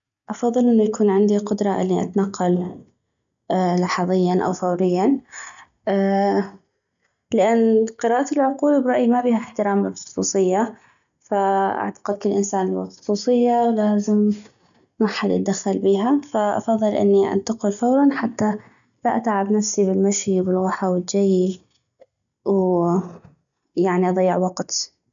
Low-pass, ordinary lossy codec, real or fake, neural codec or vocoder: 7.2 kHz; none; real; none